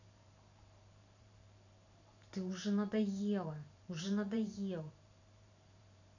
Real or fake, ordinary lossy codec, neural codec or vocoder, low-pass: fake; none; vocoder, 44.1 kHz, 128 mel bands every 512 samples, BigVGAN v2; 7.2 kHz